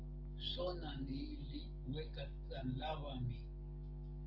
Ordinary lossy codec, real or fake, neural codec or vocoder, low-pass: Opus, 16 kbps; real; none; 5.4 kHz